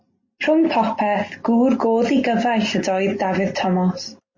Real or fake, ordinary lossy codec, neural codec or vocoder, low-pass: real; MP3, 32 kbps; none; 7.2 kHz